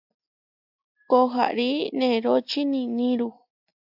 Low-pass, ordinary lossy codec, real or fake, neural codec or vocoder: 5.4 kHz; AAC, 48 kbps; real; none